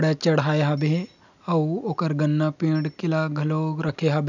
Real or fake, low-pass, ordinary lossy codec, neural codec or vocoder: real; 7.2 kHz; none; none